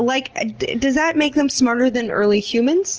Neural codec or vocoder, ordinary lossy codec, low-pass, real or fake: none; Opus, 16 kbps; 7.2 kHz; real